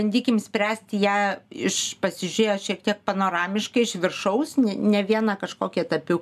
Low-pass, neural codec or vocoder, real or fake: 14.4 kHz; none; real